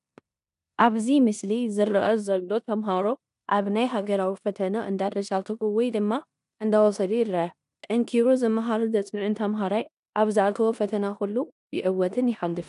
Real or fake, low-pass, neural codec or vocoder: fake; 10.8 kHz; codec, 16 kHz in and 24 kHz out, 0.9 kbps, LongCat-Audio-Codec, four codebook decoder